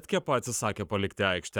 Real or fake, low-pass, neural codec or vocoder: fake; 19.8 kHz; codec, 44.1 kHz, 7.8 kbps, Pupu-Codec